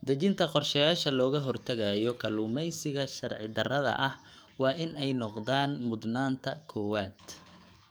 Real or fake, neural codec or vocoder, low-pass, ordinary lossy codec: fake; codec, 44.1 kHz, 7.8 kbps, DAC; none; none